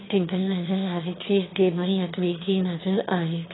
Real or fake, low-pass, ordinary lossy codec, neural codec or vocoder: fake; 7.2 kHz; AAC, 16 kbps; autoencoder, 22.05 kHz, a latent of 192 numbers a frame, VITS, trained on one speaker